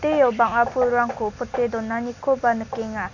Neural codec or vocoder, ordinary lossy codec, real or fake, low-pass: none; none; real; 7.2 kHz